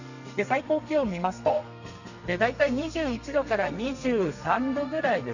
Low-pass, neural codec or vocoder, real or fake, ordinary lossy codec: 7.2 kHz; codec, 32 kHz, 1.9 kbps, SNAC; fake; none